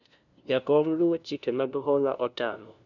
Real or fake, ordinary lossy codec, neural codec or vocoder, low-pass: fake; none; codec, 16 kHz, 0.5 kbps, FunCodec, trained on LibriTTS, 25 frames a second; 7.2 kHz